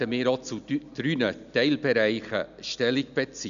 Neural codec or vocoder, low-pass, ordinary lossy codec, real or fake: none; 7.2 kHz; none; real